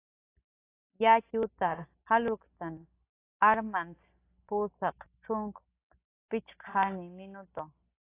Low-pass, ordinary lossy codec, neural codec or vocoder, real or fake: 3.6 kHz; AAC, 16 kbps; none; real